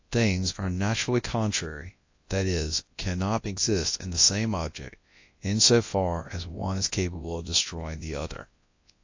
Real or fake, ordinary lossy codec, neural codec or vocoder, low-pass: fake; AAC, 48 kbps; codec, 24 kHz, 0.9 kbps, WavTokenizer, large speech release; 7.2 kHz